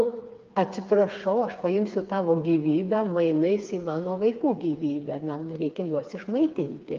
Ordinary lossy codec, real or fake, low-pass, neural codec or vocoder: Opus, 24 kbps; fake; 7.2 kHz; codec, 16 kHz, 4 kbps, FreqCodec, smaller model